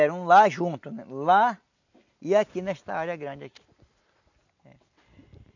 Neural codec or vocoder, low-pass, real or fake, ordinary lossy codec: none; 7.2 kHz; real; none